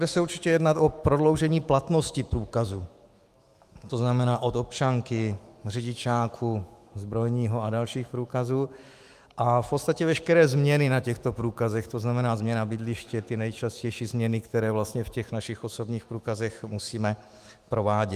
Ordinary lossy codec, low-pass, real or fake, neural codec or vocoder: Opus, 32 kbps; 14.4 kHz; fake; autoencoder, 48 kHz, 128 numbers a frame, DAC-VAE, trained on Japanese speech